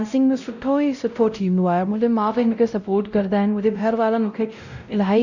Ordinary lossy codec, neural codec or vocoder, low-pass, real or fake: none; codec, 16 kHz, 0.5 kbps, X-Codec, WavLM features, trained on Multilingual LibriSpeech; 7.2 kHz; fake